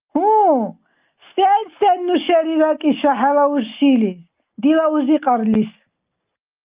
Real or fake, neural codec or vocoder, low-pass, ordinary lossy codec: real; none; 3.6 kHz; Opus, 24 kbps